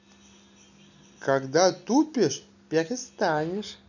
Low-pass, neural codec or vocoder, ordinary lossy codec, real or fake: 7.2 kHz; none; none; real